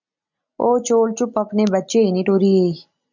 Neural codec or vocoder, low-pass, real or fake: none; 7.2 kHz; real